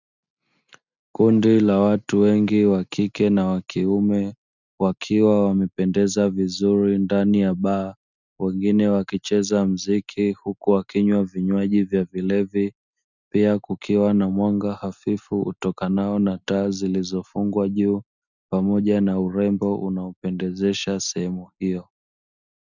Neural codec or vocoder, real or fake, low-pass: none; real; 7.2 kHz